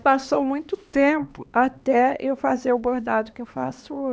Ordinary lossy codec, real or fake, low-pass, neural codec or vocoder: none; fake; none; codec, 16 kHz, 2 kbps, X-Codec, HuBERT features, trained on LibriSpeech